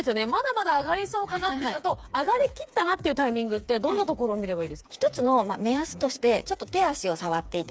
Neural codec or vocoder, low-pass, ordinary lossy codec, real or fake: codec, 16 kHz, 4 kbps, FreqCodec, smaller model; none; none; fake